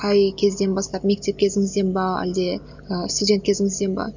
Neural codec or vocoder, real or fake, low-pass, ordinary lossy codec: none; real; 7.2 kHz; none